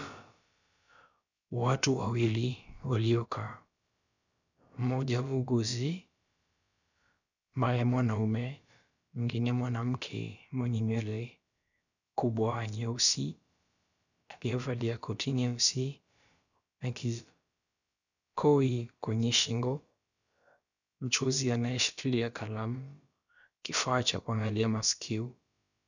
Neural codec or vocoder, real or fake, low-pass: codec, 16 kHz, about 1 kbps, DyCAST, with the encoder's durations; fake; 7.2 kHz